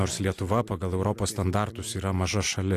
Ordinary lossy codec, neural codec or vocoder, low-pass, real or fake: AAC, 64 kbps; none; 14.4 kHz; real